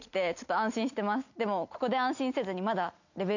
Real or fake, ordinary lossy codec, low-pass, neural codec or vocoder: real; none; 7.2 kHz; none